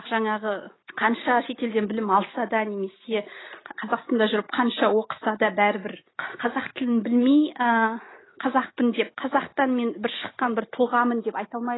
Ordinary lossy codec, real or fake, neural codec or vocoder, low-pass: AAC, 16 kbps; real; none; 7.2 kHz